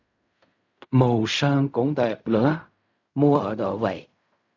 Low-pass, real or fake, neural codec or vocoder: 7.2 kHz; fake; codec, 16 kHz in and 24 kHz out, 0.4 kbps, LongCat-Audio-Codec, fine tuned four codebook decoder